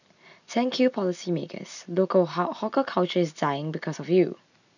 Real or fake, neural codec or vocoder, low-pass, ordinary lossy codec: real; none; 7.2 kHz; none